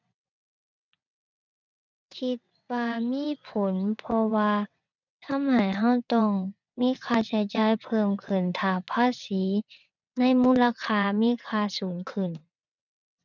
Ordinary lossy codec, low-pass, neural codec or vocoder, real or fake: none; 7.2 kHz; vocoder, 22.05 kHz, 80 mel bands, WaveNeXt; fake